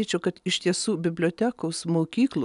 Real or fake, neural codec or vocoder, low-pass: real; none; 10.8 kHz